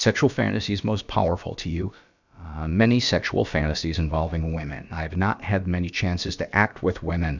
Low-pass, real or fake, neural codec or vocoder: 7.2 kHz; fake; codec, 16 kHz, about 1 kbps, DyCAST, with the encoder's durations